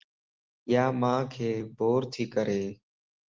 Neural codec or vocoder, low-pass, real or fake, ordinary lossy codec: none; 7.2 kHz; real; Opus, 16 kbps